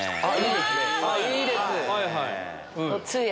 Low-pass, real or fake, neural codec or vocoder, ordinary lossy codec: none; real; none; none